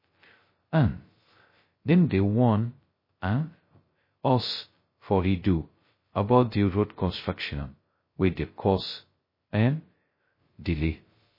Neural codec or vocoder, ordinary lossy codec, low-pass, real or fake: codec, 16 kHz, 0.2 kbps, FocalCodec; MP3, 24 kbps; 5.4 kHz; fake